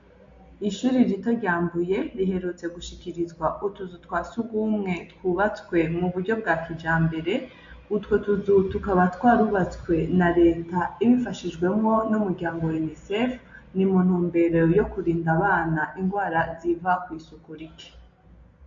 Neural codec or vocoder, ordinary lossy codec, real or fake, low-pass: none; MP3, 48 kbps; real; 7.2 kHz